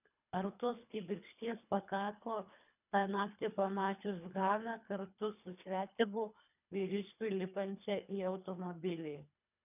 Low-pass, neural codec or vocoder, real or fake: 3.6 kHz; codec, 24 kHz, 3 kbps, HILCodec; fake